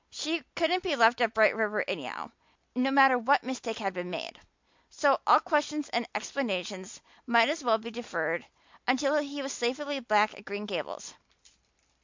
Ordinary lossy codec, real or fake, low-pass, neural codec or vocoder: MP3, 64 kbps; real; 7.2 kHz; none